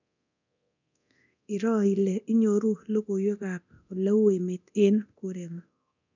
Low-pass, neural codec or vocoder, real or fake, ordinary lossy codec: 7.2 kHz; codec, 24 kHz, 0.9 kbps, DualCodec; fake; none